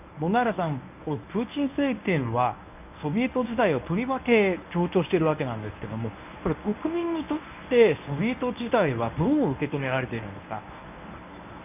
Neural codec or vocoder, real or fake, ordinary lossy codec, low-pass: codec, 24 kHz, 0.9 kbps, WavTokenizer, medium speech release version 1; fake; none; 3.6 kHz